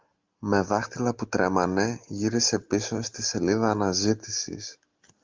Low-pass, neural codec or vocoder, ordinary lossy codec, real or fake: 7.2 kHz; none; Opus, 32 kbps; real